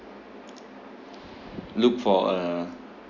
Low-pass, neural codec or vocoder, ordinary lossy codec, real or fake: 7.2 kHz; none; Opus, 64 kbps; real